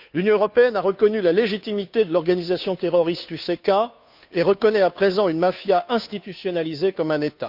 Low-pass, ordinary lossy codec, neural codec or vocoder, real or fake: 5.4 kHz; none; codec, 16 kHz, 2 kbps, FunCodec, trained on Chinese and English, 25 frames a second; fake